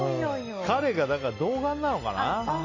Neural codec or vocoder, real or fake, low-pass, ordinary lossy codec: none; real; 7.2 kHz; AAC, 32 kbps